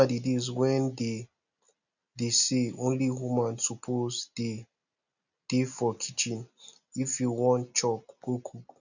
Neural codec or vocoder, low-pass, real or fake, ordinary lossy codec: none; 7.2 kHz; real; none